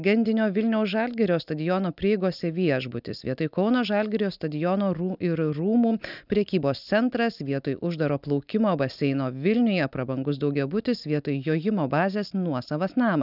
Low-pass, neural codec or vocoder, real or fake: 5.4 kHz; none; real